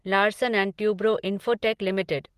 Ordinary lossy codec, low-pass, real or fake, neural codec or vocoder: Opus, 16 kbps; 14.4 kHz; real; none